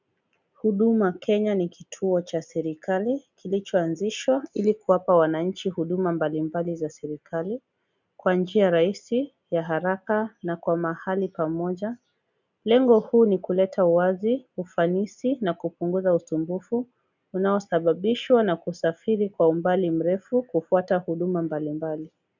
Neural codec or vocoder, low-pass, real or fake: none; 7.2 kHz; real